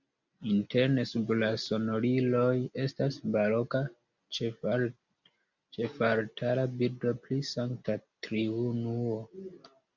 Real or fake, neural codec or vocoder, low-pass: real; none; 7.2 kHz